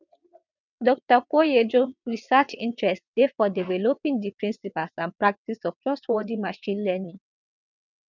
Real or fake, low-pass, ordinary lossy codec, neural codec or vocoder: fake; 7.2 kHz; none; vocoder, 22.05 kHz, 80 mel bands, WaveNeXt